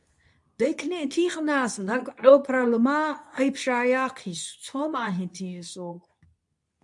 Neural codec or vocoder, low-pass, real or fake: codec, 24 kHz, 0.9 kbps, WavTokenizer, medium speech release version 2; 10.8 kHz; fake